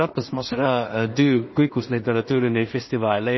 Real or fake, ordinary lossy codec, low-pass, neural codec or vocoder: fake; MP3, 24 kbps; 7.2 kHz; codec, 16 kHz in and 24 kHz out, 0.4 kbps, LongCat-Audio-Codec, two codebook decoder